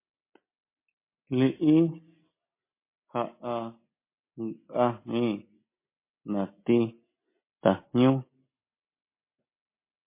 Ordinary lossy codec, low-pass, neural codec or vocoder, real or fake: MP3, 24 kbps; 3.6 kHz; none; real